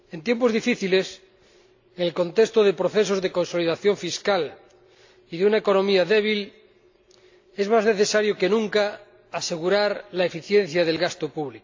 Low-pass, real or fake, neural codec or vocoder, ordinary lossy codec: 7.2 kHz; real; none; AAC, 48 kbps